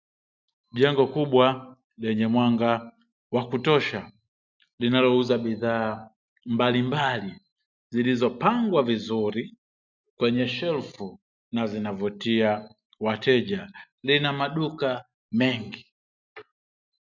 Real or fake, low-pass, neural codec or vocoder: real; 7.2 kHz; none